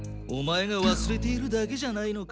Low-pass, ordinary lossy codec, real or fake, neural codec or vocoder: none; none; real; none